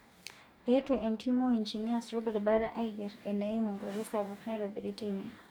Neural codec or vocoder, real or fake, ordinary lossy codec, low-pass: codec, 44.1 kHz, 2.6 kbps, DAC; fake; none; none